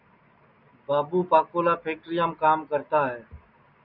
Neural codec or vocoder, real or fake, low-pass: none; real; 5.4 kHz